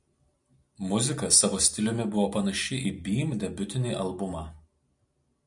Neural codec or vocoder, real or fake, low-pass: none; real; 10.8 kHz